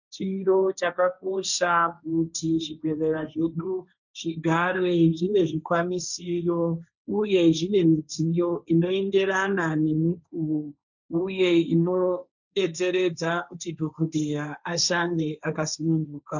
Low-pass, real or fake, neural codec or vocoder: 7.2 kHz; fake; codec, 16 kHz, 1.1 kbps, Voila-Tokenizer